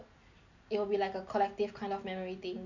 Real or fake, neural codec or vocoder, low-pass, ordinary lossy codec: real; none; 7.2 kHz; none